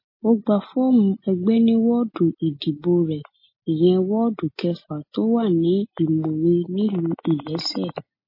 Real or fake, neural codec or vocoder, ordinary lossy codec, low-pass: real; none; MP3, 24 kbps; 5.4 kHz